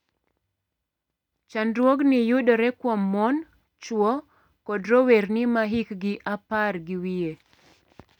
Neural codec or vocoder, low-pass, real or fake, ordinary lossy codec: none; 19.8 kHz; real; none